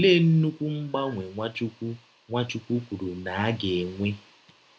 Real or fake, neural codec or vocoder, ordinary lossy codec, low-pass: real; none; none; none